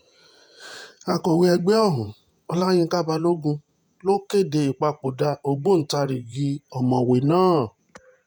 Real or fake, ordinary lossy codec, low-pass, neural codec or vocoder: fake; none; 19.8 kHz; vocoder, 48 kHz, 128 mel bands, Vocos